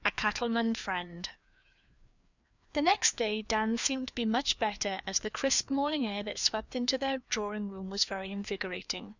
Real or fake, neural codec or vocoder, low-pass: fake; codec, 16 kHz, 2 kbps, FreqCodec, larger model; 7.2 kHz